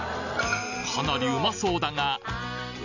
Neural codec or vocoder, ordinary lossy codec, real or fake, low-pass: none; none; real; 7.2 kHz